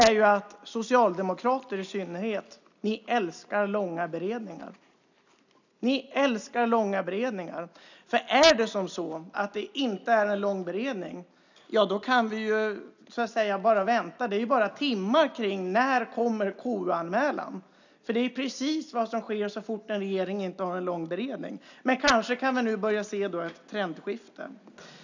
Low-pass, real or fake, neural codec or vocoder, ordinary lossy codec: 7.2 kHz; real; none; none